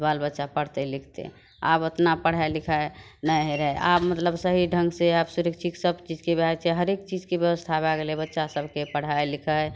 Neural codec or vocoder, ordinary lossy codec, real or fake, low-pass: none; none; real; none